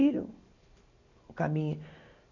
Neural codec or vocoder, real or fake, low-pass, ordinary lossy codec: none; real; 7.2 kHz; none